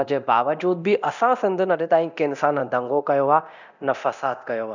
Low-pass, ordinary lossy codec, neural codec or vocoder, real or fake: 7.2 kHz; none; codec, 24 kHz, 0.9 kbps, DualCodec; fake